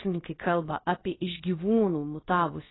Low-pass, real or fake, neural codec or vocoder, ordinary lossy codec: 7.2 kHz; fake; codec, 16 kHz, about 1 kbps, DyCAST, with the encoder's durations; AAC, 16 kbps